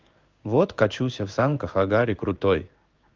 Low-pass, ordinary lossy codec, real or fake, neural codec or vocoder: 7.2 kHz; Opus, 32 kbps; fake; codec, 16 kHz in and 24 kHz out, 1 kbps, XY-Tokenizer